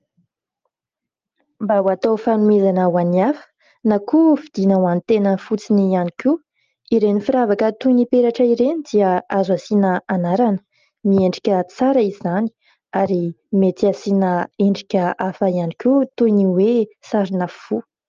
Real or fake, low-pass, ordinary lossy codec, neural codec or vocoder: real; 7.2 kHz; Opus, 24 kbps; none